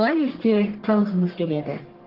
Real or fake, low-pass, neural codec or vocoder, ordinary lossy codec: fake; 5.4 kHz; codec, 44.1 kHz, 1.7 kbps, Pupu-Codec; Opus, 16 kbps